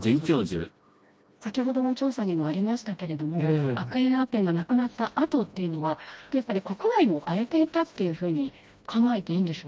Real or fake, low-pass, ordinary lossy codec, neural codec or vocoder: fake; none; none; codec, 16 kHz, 1 kbps, FreqCodec, smaller model